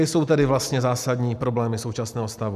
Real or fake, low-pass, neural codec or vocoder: real; 14.4 kHz; none